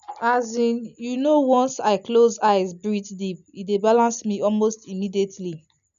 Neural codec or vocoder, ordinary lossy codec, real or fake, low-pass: none; none; real; 7.2 kHz